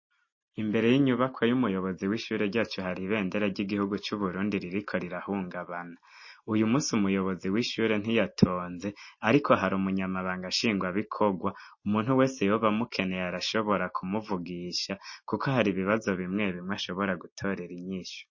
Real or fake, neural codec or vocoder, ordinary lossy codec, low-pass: real; none; MP3, 32 kbps; 7.2 kHz